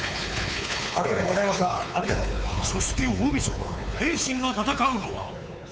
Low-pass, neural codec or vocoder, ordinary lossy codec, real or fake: none; codec, 16 kHz, 4 kbps, X-Codec, WavLM features, trained on Multilingual LibriSpeech; none; fake